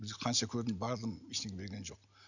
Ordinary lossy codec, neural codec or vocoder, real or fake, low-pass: none; none; real; 7.2 kHz